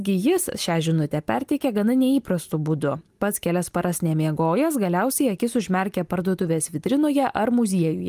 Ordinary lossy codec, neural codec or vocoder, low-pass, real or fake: Opus, 32 kbps; none; 14.4 kHz; real